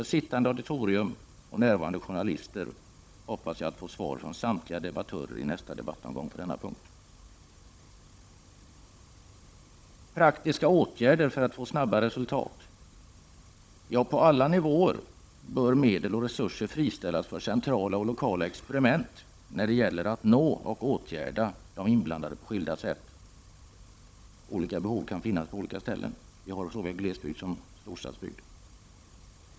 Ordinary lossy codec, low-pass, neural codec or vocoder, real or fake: none; none; codec, 16 kHz, 16 kbps, FunCodec, trained on Chinese and English, 50 frames a second; fake